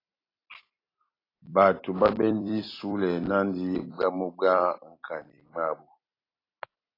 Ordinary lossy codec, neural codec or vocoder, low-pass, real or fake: AAC, 24 kbps; vocoder, 44.1 kHz, 128 mel bands every 256 samples, BigVGAN v2; 5.4 kHz; fake